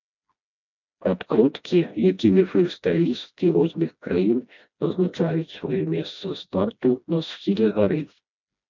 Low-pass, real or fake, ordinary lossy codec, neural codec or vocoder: 7.2 kHz; fake; MP3, 64 kbps; codec, 16 kHz, 1 kbps, FreqCodec, smaller model